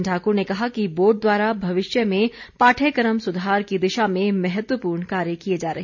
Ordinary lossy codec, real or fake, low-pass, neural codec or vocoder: none; real; 7.2 kHz; none